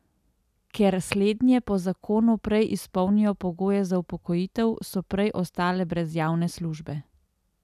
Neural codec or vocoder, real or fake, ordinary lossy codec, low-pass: none; real; none; 14.4 kHz